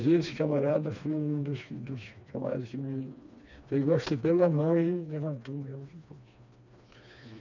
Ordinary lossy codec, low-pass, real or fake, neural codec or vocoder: none; 7.2 kHz; fake; codec, 16 kHz, 2 kbps, FreqCodec, smaller model